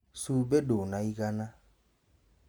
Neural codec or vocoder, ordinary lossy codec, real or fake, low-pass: none; none; real; none